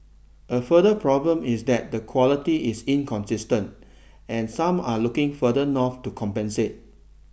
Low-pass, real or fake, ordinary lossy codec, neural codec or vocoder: none; real; none; none